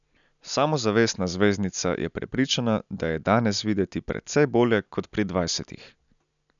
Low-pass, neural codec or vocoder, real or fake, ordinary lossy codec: 7.2 kHz; none; real; none